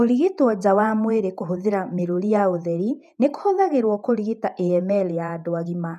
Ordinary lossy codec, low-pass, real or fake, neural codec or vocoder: none; 14.4 kHz; fake; vocoder, 48 kHz, 128 mel bands, Vocos